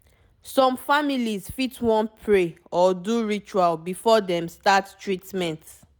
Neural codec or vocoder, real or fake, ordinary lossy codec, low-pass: none; real; none; none